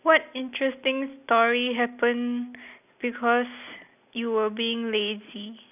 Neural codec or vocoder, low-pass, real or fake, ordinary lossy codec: none; 3.6 kHz; real; none